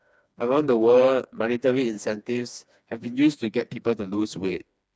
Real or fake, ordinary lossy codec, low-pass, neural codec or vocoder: fake; none; none; codec, 16 kHz, 2 kbps, FreqCodec, smaller model